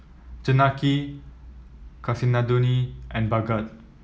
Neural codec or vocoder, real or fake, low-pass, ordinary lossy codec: none; real; none; none